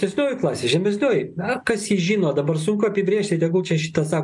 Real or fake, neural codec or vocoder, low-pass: real; none; 10.8 kHz